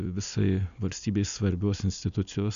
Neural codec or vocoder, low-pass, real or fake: none; 7.2 kHz; real